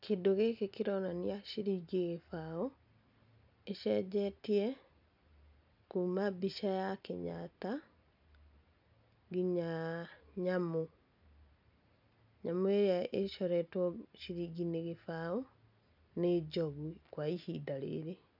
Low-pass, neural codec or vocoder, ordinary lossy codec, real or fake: 5.4 kHz; none; none; real